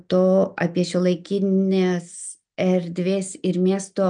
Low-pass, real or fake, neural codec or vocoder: 9.9 kHz; real; none